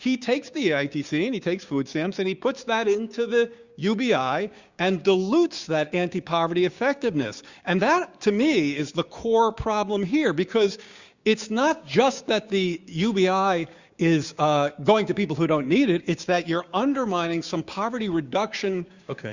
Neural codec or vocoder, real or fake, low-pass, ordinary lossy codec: codec, 16 kHz, 6 kbps, DAC; fake; 7.2 kHz; Opus, 64 kbps